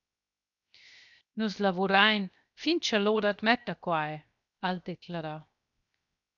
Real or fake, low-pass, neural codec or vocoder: fake; 7.2 kHz; codec, 16 kHz, 0.7 kbps, FocalCodec